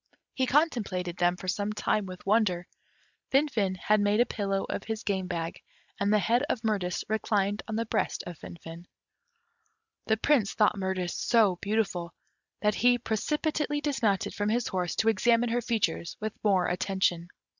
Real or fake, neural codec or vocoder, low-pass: real; none; 7.2 kHz